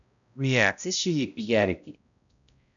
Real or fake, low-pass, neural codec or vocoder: fake; 7.2 kHz; codec, 16 kHz, 0.5 kbps, X-Codec, HuBERT features, trained on balanced general audio